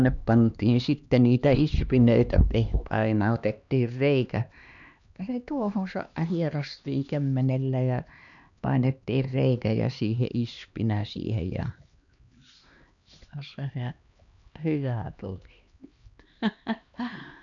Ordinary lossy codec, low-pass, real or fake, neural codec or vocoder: none; 7.2 kHz; fake; codec, 16 kHz, 2 kbps, X-Codec, HuBERT features, trained on LibriSpeech